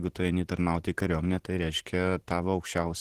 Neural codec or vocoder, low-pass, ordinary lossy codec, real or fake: vocoder, 44.1 kHz, 128 mel bands every 512 samples, BigVGAN v2; 14.4 kHz; Opus, 16 kbps; fake